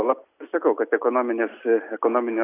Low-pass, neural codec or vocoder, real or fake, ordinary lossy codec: 3.6 kHz; none; real; AAC, 24 kbps